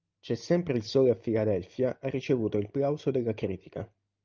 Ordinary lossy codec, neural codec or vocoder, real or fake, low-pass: Opus, 24 kbps; none; real; 7.2 kHz